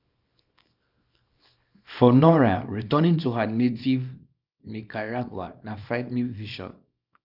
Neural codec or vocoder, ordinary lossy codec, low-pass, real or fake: codec, 24 kHz, 0.9 kbps, WavTokenizer, small release; none; 5.4 kHz; fake